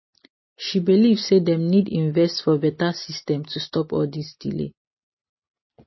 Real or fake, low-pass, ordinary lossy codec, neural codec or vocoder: real; 7.2 kHz; MP3, 24 kbps; none